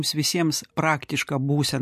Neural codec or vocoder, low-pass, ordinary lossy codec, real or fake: none; 14.4 kHz; MP3, 64 kbps; real